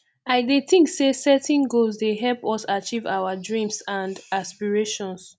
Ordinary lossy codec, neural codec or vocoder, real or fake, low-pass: none; none; real; none